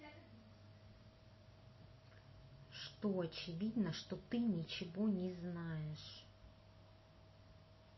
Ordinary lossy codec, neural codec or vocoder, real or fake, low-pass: MP3, 24 kbps; none; real; 7.2 kHz